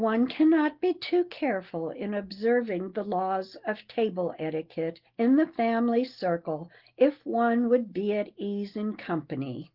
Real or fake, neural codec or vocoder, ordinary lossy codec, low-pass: real; none; Opus, 16 kbps; 5.4 kHz